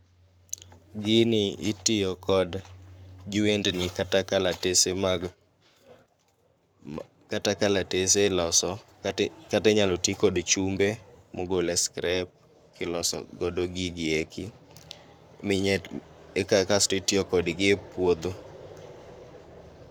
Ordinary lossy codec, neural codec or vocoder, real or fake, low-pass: none; codec, 44.1 kHz, 7.8 kbps, Pupu-Codec; fake; none